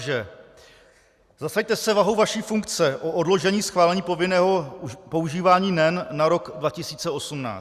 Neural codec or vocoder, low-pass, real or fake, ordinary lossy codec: none; 14.4 kHz; real; Opus, 64 kbps